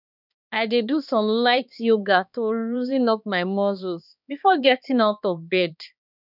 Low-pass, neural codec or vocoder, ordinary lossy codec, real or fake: 5.4 kHz; codec, 16 kHz, 2 kbps, X-Codec, HuBERT features, trained on balanced general audio; none; fake